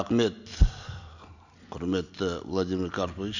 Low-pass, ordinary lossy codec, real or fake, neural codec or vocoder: 7.2 kHz; none; real; none